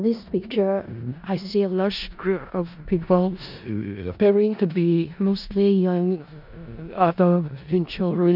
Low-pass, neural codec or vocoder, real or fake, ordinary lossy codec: 5.4 kHz; codec, 16 kHz in and 24 kHz out, 0.4 kbps, LongCat-Audio-Codec, four codebook decoder; fake; none